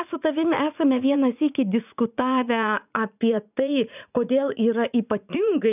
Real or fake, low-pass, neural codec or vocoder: fake; 3.6 kHz; vocoder, 44.1 kHz, 80 mel bands, Vocos